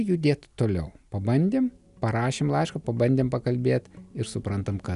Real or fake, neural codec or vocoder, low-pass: real; none; 10.8 kHz